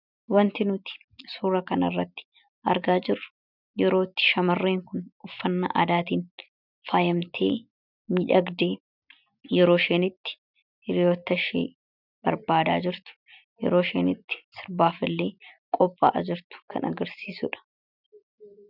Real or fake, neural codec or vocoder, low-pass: real; none; 5.4 kHz